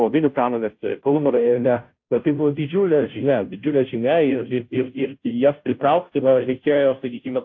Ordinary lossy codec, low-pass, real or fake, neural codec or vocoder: AAC, 48 kbps; 7.2 kHz; fake; codec, 16 kHz, 0.5 kbps, FunCodec, trained on Chinese and English, 25 frames a second